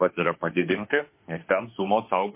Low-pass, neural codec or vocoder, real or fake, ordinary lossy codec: 3.6 kHz; autoencoder, 48 kHz, 32 numbers a frame, DAC-VAE, trained on Japanese speech; fake; MP3, 24 kbps